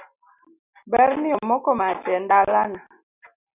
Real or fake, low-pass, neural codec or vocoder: real; 3.6 kHz; none